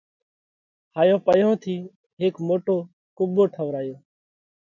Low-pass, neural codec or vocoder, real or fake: 7.2 kHz; none; real